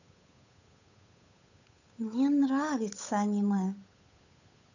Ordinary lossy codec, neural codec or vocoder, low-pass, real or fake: none; codec, 16 kHz, 8 kbps, FunCodec, trained on Chinese and English, 25 frames a second; 7.2 kHz; fake